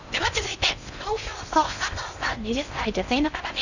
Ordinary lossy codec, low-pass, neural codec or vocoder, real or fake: none; 7.2 kHz; codec, 16 kHz in and 24 kHz out, 0.6 kbps, FocalCodec, streaming, 4096 codes; fake